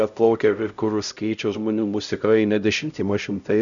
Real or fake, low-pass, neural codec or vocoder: fake; 7.2 kHz; codec, 16 kHz, 0.5 kbps, X-Codec, HuBERT features, trained on LibriSpeech